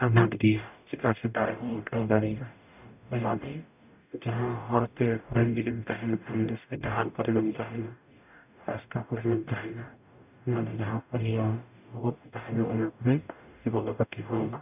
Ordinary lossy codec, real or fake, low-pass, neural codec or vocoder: none; fake; 3.6 kHz; codec, 44.1 kHz, 0.9 kbps, DAC